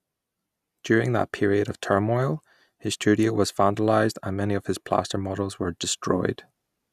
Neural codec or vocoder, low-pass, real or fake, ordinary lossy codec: none; 14.4 kHz; real; none